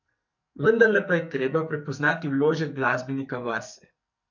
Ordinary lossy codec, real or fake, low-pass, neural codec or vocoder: none; fake; 7.2 kHz; codec, 44.1 kHz, 2.6 kbps, SNAC